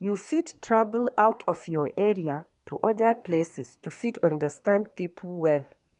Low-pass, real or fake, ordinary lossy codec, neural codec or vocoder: 10.8 kHz; fake; none; codec, 24 kHz, 1 kbps, SNAC